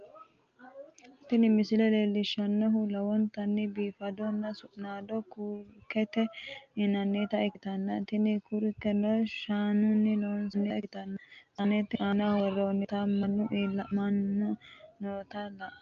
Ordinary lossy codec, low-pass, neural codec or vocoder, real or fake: Opus, 24 kbps; 7.2 kHz; none; real